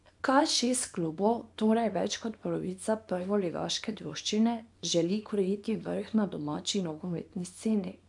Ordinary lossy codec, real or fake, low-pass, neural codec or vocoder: none; fake; 10.8 kHz; codec, 24 kHz, 0.9 kbps, WavTokenizer, small release